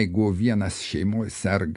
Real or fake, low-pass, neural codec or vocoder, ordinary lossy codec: real; 10.8 kHz; none; MP3, 64 kbps